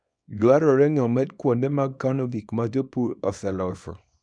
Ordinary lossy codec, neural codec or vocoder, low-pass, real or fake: none; codec, 24 kHz, 0.9 kbps, WavTokenizer, small release; 9.9 kHz; fake